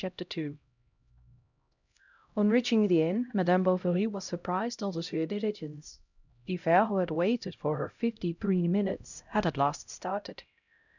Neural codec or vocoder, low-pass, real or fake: codec, 16 kHz, 0.5 kbps, X-Codec, HuBERT features, trained on LibriSpeech; 7.2 kHz; fake